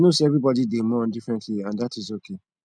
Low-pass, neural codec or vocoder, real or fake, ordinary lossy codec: 9.9 kHz; none; real; none